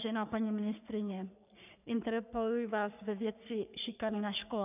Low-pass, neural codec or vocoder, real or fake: 3.6 kHz; codec, 44.1 kHz, 3.4 kbps, Pupu-Codec; fake